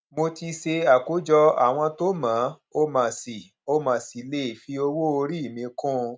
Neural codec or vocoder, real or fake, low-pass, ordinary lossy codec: none; real; none; none